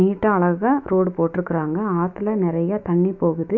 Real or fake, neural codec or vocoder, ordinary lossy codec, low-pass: fake; autoencoder, 48 kHz, 128 numbers a frame, DAC-VAE, trained on Japanese speech; AAC, 48 kbps; 7.2 kHz